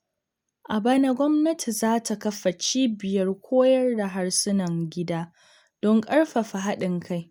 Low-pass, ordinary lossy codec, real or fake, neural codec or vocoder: none; none; real; none